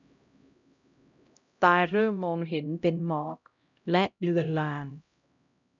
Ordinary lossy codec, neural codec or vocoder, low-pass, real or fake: none; codec, 16 kHz, 0.5 kbps, X-Codec, HuBERT features, trained on LibriSpeech; 7.2 kHz; fake